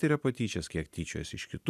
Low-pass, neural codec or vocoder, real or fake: 14.4 kHz; none; real